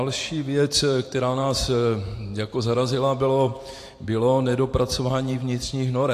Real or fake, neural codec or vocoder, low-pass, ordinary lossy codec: fake; vocoder, 44.1 kHz, 128 mel bands every 512 samples, BigVGAN v2; 14.4 kHz; AAC, 64 kbps